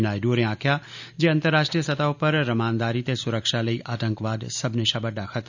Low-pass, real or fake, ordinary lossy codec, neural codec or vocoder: 7.2 kHz; real; none; none